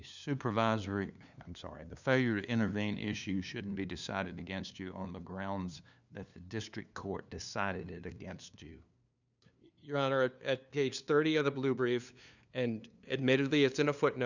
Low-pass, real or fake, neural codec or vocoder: 7.2 kHz; fake; codec, 16 kHz, 2 kbps, FunCodec, trained on LibriTTS, 25 frames a second